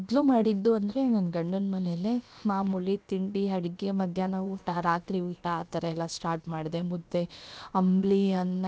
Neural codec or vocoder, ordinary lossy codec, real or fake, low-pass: codec, 16 kHz, about 1 kbps, DyCAST, with the encoder's durations; none; fake; none